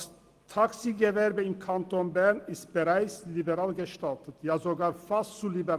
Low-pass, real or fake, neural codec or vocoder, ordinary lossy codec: 14.4 kHz; real; none; Opus, 24 kbps